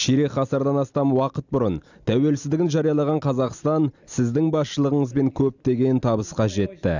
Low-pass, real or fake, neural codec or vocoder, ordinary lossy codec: 7.2 kHz; real; none; none